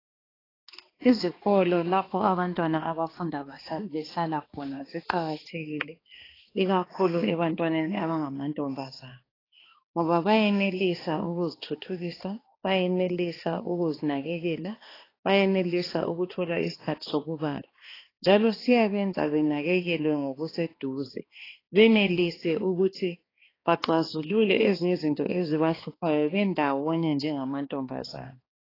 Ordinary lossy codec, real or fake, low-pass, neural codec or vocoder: AAC, 24 kbps; fake; 5.4 kHz; codec, 16 kHz, 2 kbps, X-Codec, HuBERT features, trained on balanced general audio